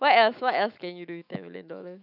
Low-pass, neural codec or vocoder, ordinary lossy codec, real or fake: 5.4 kHz; autoencoder, 48 kHz, 128 numbers a frame, DAC-VAE, trained on Japanese speech; none; fake